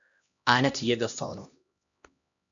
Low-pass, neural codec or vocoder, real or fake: 7.2 kHz; codec, 16 kHz, 1 kbps, X-Codec, HuBERT features, trained on LibriSpeech; fake